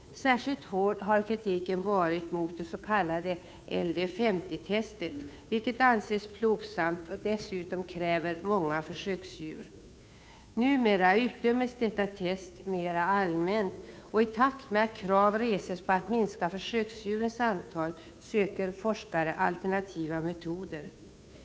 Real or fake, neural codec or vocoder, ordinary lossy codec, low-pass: fake; codec, 16 kHz, 2 kbps, FunCodec, trained on Chinese and English, 25 frames a second; none; none